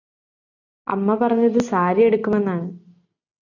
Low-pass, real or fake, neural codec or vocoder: 7.2 kHz; real; none